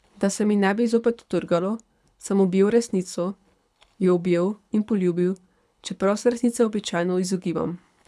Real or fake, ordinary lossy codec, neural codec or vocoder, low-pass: fake; none; codec, 24 kHz, 6 kbps, HILCodec; none